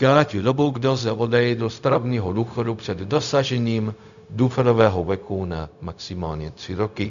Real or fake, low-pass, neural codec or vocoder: fake; 7.2 kHz; codec, 16 kHz, 0.4 kbps, LongCat-Audio-Codec